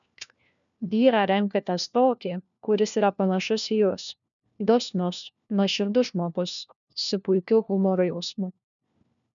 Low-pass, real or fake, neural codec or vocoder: 7.2 kHz; fake; codec, 16 kHz, 1 kbps, FunCodec, trained on LibriTTS, 50 frames a second